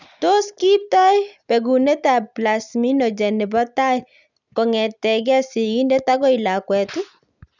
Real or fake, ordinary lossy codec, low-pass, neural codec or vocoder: real; none; 7.2 kHz; none